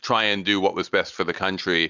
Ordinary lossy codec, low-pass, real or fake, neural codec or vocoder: Opus, 64 kbps; 7.2 kHz; real; none